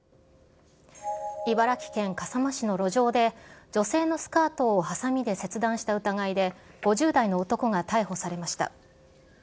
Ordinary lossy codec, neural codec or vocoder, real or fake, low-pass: none; none; real; none